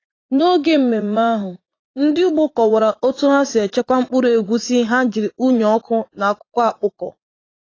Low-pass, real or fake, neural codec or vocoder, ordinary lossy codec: 7.2 kHz; fake; vocoder, 44.1 kHz, 80 mel bands, Vocos; AAC, 32 kbps